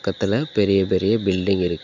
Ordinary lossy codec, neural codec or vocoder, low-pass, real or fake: none; none; 7.2 kHz; real